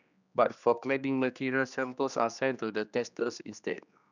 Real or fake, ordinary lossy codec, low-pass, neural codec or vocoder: fake; none; 7.2 kHz; codec, 16 kHz, 2 kbps, X-Codec, HuBERT features, trained on general audio